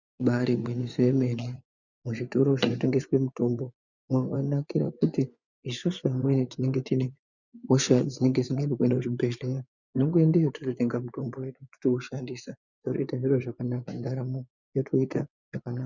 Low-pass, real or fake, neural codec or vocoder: 7.2 kHz; fake; vocoder, 24 kHz, 100 mel bands, Vocos